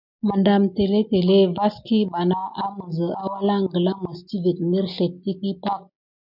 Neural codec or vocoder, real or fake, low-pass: none; real; 5.4 kHz